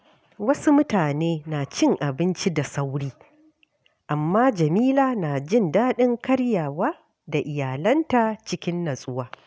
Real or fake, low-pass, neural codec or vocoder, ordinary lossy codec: real; none; none; none